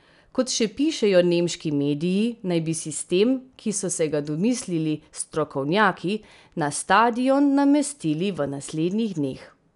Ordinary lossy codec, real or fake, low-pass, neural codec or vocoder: none; real; 10.8 kHz; none